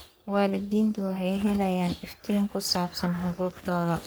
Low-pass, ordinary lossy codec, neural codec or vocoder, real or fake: none; none; codec, 44.1 kHz, 3.4 kbps, Pupu-Codec; fake